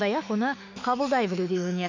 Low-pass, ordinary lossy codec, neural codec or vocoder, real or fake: 7.2 kHz; MP3, 64 kbps; autoencoder, 48 kHz, 32 numbers a frame, DAC-VAE, trained on Japanese speech; fake